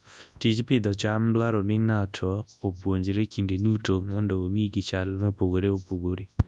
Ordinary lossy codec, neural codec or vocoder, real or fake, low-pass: none; codec, 24 kHz, 0.9 kbps, WavTokenizer, large speech release; fake; 10.8 kHz